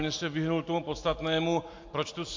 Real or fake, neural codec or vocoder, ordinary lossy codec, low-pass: real; none; MP3, 48 kbps; 7.2 kHz